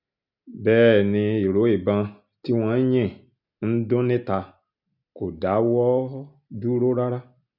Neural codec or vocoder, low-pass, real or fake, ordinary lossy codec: none; 5.4 kHz; real; none